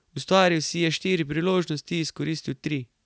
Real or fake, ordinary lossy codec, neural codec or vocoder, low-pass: real; none; none; none